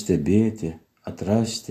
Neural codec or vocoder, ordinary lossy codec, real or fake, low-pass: none; AAC, 64 kbps; real; 14.4 kHz